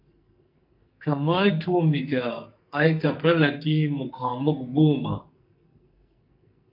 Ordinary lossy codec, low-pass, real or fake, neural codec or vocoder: MP3, 48 kbps; 5.4 kHz; fake; codec, 44.1 kHz, 2.6 kbps, SNAC